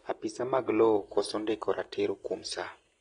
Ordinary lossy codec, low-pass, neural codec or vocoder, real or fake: AAC, 32 kbps; 9.9 kHz; none; real